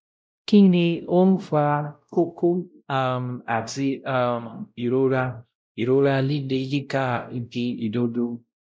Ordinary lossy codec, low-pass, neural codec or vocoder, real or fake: none; none; codec, 16 kHz, 0.5 kbps, X-Codec, WavLM features, trained on Multilingual LibriSpeech; fake